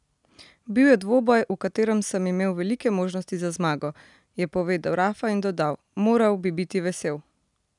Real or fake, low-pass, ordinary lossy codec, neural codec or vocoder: real; 10.8 kHz; none; none